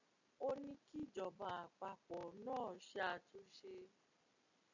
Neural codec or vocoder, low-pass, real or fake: none; 7.2 kHz; real